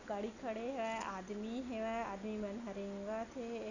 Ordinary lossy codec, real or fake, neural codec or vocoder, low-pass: none; real; none; 7.2 kHz